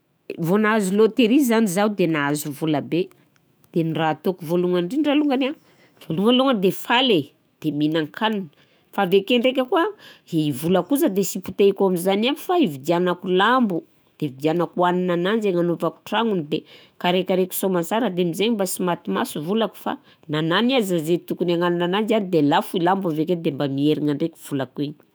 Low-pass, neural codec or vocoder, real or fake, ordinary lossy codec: none; autoencoder, 48 kHz, 128 numbers a frame, DAC-VAE, trained on Japanese speech; fake; none